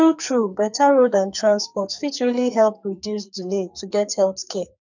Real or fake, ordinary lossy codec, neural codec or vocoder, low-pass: fake; none; codec, 44.1 kHz, 2.6 kbps, SNAC; 7.2 kHz